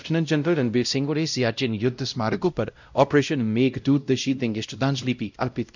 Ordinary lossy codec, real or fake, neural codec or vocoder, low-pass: none; fake; codec, 16 kHz, 0.5 kbps, X-Codec, WavLM features, trained on Multilingual LibriSpeech; 7.2 kHz